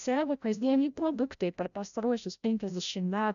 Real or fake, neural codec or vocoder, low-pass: fake; codec, 16 kHz, 0.5 kbps, FreqCodec, larger model; 7.2 kHz